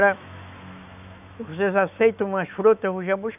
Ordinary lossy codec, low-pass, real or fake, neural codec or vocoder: none; 3.6 kHz; fake; autoencoder, 48 kHz, 128 numbers a frame, DAC-VAE, trained on Japanese speech